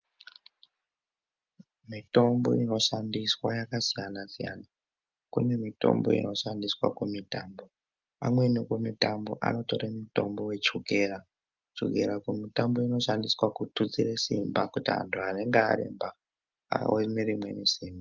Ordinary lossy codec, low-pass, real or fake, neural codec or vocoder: Opus, 32 kbps; 7.2 kHz; real; none